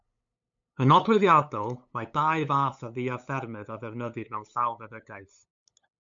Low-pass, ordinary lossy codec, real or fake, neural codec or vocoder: 7.2 kHz; MP3, 64 kbps; fake; codec, 16 kHz, 8 kbps, FunCodec, trained on LibriTTS, 25 frames a second